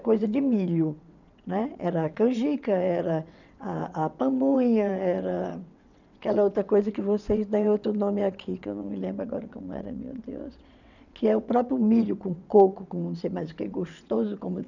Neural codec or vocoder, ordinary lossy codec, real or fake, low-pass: vocoder, 22.05 kHz, 80 mel bands, WaveNeXt; none; fake; 7.2 kHz